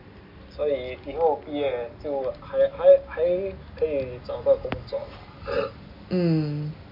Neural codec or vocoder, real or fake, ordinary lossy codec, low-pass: none; real; none; 5.4 kHz